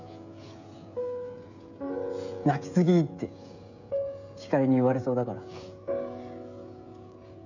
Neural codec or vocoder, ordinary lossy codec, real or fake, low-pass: codec, 16 kHz, 16 kbps, FreqCodec, smaller model; none; fake; 7.2 kHz